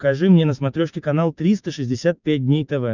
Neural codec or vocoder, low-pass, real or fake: codec, 16 kHz, 6 kbps, DAC; 7.2 kHz; fake